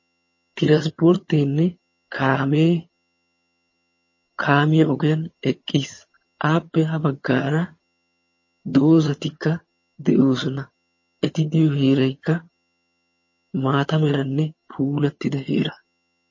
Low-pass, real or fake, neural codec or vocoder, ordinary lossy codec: 7.2 kHz; fake; vocoder, 22.05 kHz, 80 mel bands, HiFi-GAN; MP3, 32 kbps